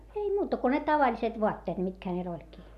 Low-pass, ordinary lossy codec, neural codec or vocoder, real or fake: 14.4 kHz; none; none; real